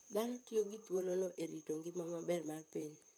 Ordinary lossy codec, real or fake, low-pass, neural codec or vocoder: none; fake; none; vocoder, 44.1 kHz, 128 mel bands, Pupu-Vocoder